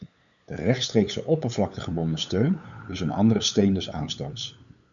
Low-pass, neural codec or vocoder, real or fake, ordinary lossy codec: 7.2 kHz; codec, 16 kHz, 8 kbps, FunCodec, trained on LibriTTS, 25 frames a second; fake; MP3, 96 kbps